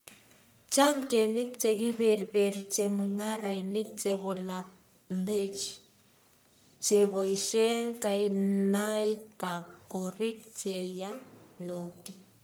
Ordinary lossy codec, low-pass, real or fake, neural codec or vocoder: none; none; fake; codec, 44.1 kHz, 1.7 kbps, Pupu-Codec